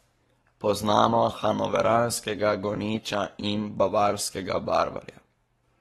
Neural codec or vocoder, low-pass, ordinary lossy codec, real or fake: codec, 44.1 kHz, 7.8 kbps, Pupu-Codec; 19.8 kHz; AAC, 32 kbps; fake